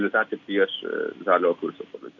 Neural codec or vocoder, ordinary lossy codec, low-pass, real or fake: none; MP3, 64 kbps; 7.2 kHz; real